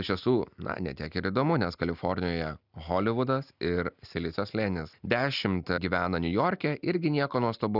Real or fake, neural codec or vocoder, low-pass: real; none; 5.4 kHz